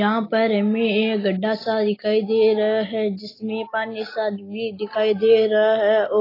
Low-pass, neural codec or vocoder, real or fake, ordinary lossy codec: 5.4 kHz; none; real; AAC, 24 kbps